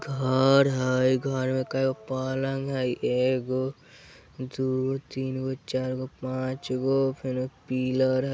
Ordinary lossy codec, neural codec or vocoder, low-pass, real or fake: none; none; none; real